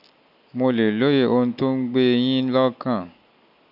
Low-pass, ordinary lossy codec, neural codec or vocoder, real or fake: 5.4 kHz; none; none; real